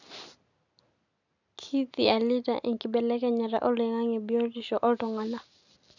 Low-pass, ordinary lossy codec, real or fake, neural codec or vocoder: 7.2 kHz; none; real; none